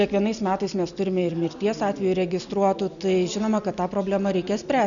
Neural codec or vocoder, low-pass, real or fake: none; 7.2 kHz; real